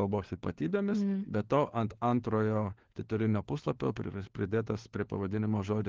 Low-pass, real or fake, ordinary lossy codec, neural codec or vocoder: 7.2 kHz; fake; Opus, 16 kbps; codec, 16 kHz, 4 kbps, FunCodec, trained on LibriTTS, 50 frames a second